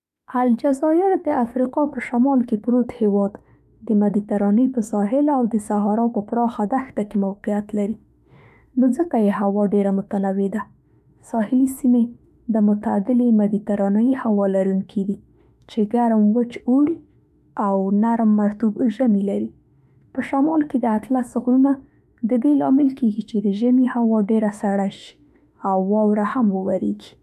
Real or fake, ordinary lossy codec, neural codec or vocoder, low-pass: fake; none; autoencoder, 48 kHz, 32 numbers a frame, DAC-VAE, trained on Japanese speech; 14.4 kHz